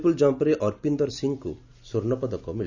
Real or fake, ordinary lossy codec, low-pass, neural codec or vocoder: real; Opus, 64 kbps; 7.2 kHz; none